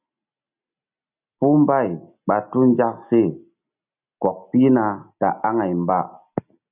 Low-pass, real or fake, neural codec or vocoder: 3.6 kHz; real; none